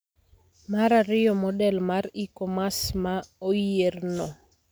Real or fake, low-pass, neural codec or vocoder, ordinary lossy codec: real; none; none; none